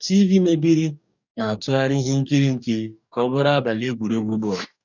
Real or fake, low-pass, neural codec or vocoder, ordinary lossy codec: fake; 7.2 kHz; codec, 44.1 kHz, 2.6 kbps, DAC; none